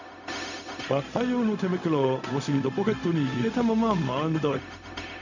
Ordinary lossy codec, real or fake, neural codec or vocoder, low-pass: none; fake; codec, 16 kHz, 0.4 kbps, LongCat-Audio-Codec; 7.2 kHz